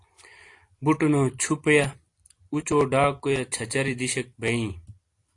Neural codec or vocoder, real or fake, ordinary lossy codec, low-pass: vocoder, 44.1 kHz, 128 mel bands every 256 samples, BigVGAN v2; fake; AAC, 48 kbps; 10.8 kHz